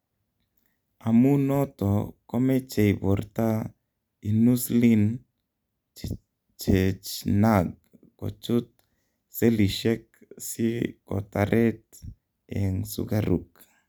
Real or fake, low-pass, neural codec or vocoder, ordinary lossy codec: real; none; none; none